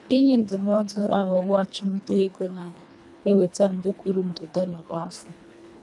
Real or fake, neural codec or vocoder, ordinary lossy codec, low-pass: fake; codec, 24 kHz, 1.5 kbps, HILCodec; none; none